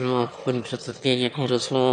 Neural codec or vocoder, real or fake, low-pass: autoencoder, 22.05 kHz, a latent of 192 numbers a frame, VITS, trained on one speaker; fake; 9.9 kHz